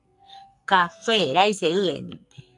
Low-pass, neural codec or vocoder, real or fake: 10.8 kHz; codec, 44.1 kHz, 2.6 kbps, SNAC; fake